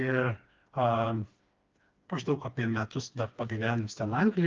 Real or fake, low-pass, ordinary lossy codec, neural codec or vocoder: fake; 7.2 kHz; Opus, 24 kbps; codec, 16 kHz, 2 kbps, FreqCodec, smaller model